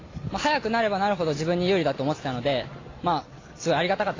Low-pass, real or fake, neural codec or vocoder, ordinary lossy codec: 7.2 kHz; real; none; AAC, 32 kbps